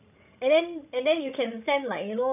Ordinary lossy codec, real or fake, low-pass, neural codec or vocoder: none; fake; 3.6 kHz; codec, 16 kHz, 16 kbps, FreqCodec, larger model